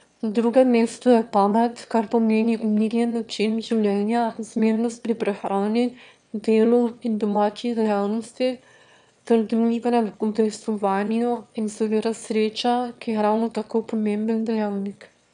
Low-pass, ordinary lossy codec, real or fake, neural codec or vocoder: 9.9 kHz; none; fake; autoencoder, 22.05 kHz, a latent of 192 numbers a frame, VITS, trained on one speaker